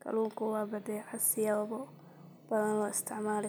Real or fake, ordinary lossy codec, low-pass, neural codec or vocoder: real; none; none; none